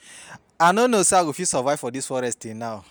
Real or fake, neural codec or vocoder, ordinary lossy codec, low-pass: real; none; none; none